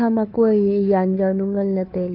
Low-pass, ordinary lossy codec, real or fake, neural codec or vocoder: 5.4 kHz; AAC, 32 kbps; fake; codec, 16 kHz, 2 kbps, FunCodec, trained on Chinese and English, 25 frames a second